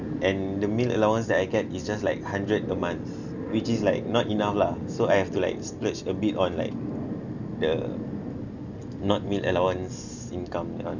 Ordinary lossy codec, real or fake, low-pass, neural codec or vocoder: none; real; 7.2 kHz; none